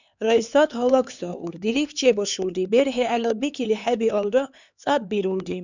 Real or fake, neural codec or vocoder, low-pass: fake; codec, 16 kHz, 4 kbps, X-Codec, HuBERT features, trained on LibriSpeech; 7.2 kHz